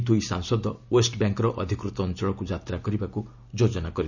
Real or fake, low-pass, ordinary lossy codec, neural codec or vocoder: real; 7.2 kHz; none; none